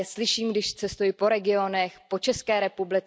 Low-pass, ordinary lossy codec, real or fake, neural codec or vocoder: none; none; real; none